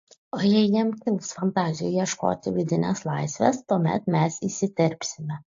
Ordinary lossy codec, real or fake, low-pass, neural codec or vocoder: AAC, 48 kbps; real; 7.2 kHz; none